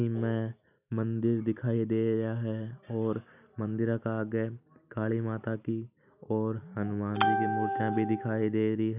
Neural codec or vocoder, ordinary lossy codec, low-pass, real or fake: none; none; 3.6 kHz; real